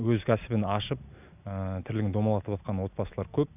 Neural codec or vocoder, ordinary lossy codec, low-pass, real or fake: none; none; 3.6 kHz; real